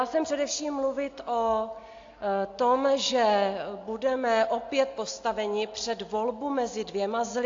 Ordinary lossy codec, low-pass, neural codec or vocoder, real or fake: AAC, 48 kbps; 7.2 kHz; none; real